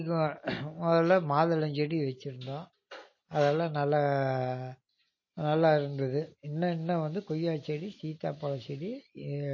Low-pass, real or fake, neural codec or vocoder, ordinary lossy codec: 7.2 kHz; real; none; MP3, 32 kbps